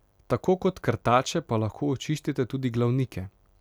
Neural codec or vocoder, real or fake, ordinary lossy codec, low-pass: none; real; none; 19.8 kHz